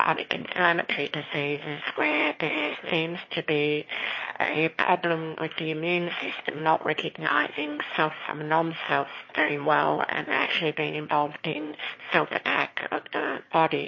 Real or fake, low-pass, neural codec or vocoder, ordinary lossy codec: fake; 7.2 kHz; autoencoder, 22.05 kHz, a latent of 192 numbers a frame, VITS, trained on one speaker; MP3, 32 kbps